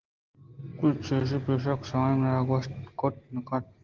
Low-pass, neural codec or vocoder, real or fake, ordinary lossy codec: 7.2 kHz; none; real; Opus, 16 kbps